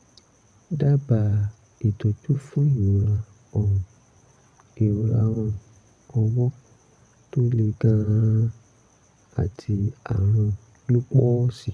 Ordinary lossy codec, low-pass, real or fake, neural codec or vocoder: none; none; fake; vocoder, 22.05 kHz, 80 mel bands, WaveNeXt